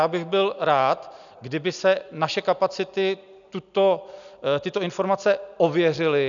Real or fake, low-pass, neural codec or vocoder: real; 7.2 kHz; none